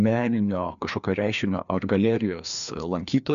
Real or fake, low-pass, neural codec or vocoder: fake; 7.2 kHz; codec, 16 kHz, 2 kbps, FreqCodec, larger model